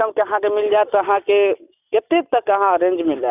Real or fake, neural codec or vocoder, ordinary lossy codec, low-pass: real; none; none; 3.6 kHz